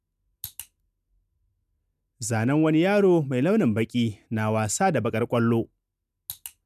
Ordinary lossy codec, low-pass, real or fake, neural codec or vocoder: none; 14.4 kHz; real; none